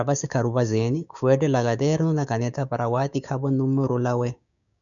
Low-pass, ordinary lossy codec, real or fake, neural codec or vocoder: 7.2 kHz; MP3, 96 kbps; fake; codec, 16 kHz, 6 kbps, DAC